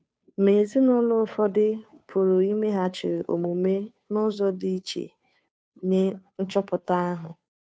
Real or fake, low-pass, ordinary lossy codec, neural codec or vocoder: fake; none; none; codec, 16 kHz, 2 kbps, FunCodec, trained on Chinese and English, 25 frames a second